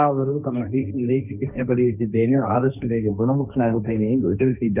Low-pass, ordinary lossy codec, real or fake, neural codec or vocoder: 3.6 kHz; none; fake; codec, 16 kHz, 1.1 kbps, Voila-Tokenizer